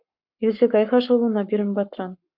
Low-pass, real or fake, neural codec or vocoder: 5.4 kHz; fake; codec, 44.1 kHz, 7.8 kbps, Pupu-Codec